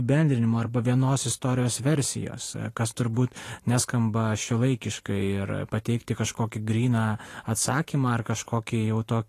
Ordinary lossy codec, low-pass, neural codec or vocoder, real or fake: AAC, 48 kbps; 14.4 kHz; vocoder, 44.1 kHz, 128 mel bands every 512 samples, BigVGAN v2; fake